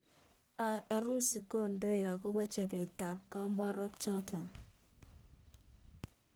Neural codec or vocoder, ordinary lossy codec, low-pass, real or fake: codec, 44.1 kHz, 1.7 kbps, Pupu-Codec; none; none; fake